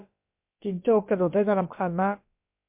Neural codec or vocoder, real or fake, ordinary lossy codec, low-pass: codec, 16 kHz, about 1 kbps, DyCAST, with the encoder's durations; fake; MP3, 32 kbps; 3.6 kHz